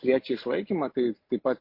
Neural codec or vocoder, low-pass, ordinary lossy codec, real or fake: none; 5.4 kHz; MP3, 32 kbps; real